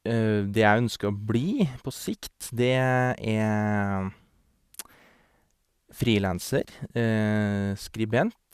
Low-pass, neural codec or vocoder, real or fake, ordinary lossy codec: 14.4 kHz; none; real; Opus, 64 kbps